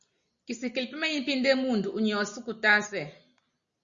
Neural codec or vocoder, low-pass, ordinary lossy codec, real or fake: none; 7.2 kHz; Opus, 64 kbps; real